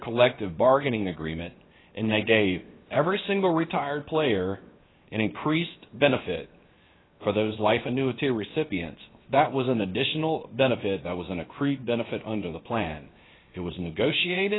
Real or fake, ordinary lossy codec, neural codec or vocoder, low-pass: fake; AAC, 16 kbps; codec, 16 kHz, 0.7 kbps, FocalCodec; 7.2 kHz